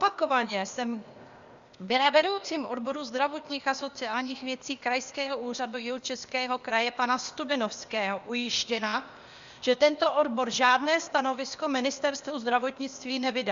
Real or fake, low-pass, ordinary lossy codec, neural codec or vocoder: fake; 7.2 kHz; Opus, 64 kbps; codec, 16 kHz, 0.8 kbps, ZipCodec